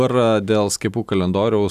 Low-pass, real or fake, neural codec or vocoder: 14.4 kHz; real; none